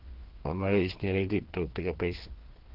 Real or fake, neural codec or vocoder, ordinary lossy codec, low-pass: fake; codec, 16 kHz, 2 kbps, FreqCodec, larger model; Opus, 16 kbps; 5.4 kHz